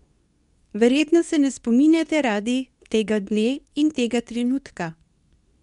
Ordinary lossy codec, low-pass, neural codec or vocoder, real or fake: none; 10.8 kHz; codec, 24 kHz, 0.9 kbps, WavTokenizer, medium speech release version 2; fake